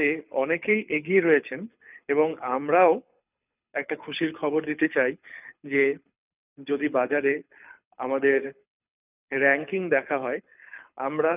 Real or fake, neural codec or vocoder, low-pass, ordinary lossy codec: fake; codec, 24 kHz, 6 kbps, HILCodec; 3.6 kHz; none